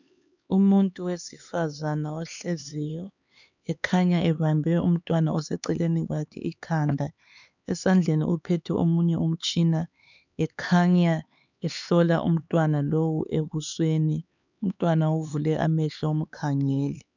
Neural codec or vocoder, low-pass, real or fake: codec, 16 kHz, 4 kbps, X-Codec, HuBERT features, trained on LibriSpeech; 7.2 kHz; fake